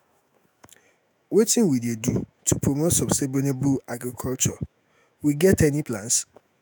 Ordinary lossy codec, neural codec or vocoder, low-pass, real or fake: none; autoencoder, 48 kHz, 128 numbers a frame, DAC-VAE, trained on Japanese speech; none; fake